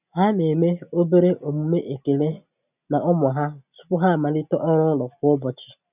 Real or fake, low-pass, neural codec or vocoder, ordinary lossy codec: real; 3.6 kHz; none; none